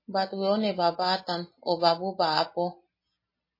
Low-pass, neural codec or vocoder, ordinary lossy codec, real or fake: 5.4 kHz; none; MP3, 24 kbps; real